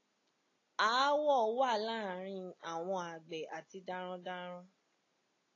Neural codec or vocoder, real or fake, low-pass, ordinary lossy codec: none; real; 7.2 kHz; AAC, 32 kbps